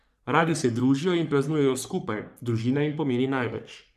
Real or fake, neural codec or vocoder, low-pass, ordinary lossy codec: fake; codec, 44.1 kHz, 3.4 kbps, Pupu-Codec; 14.4 kHz; none